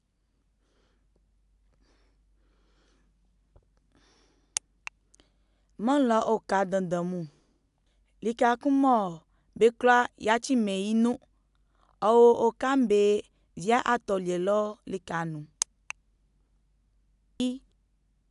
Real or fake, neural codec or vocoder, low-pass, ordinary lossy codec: real; none; 10.8 kHz; AAC, 96 kbps